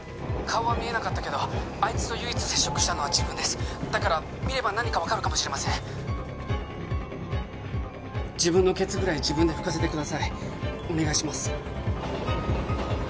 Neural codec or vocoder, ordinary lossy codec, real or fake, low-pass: none; none; real; none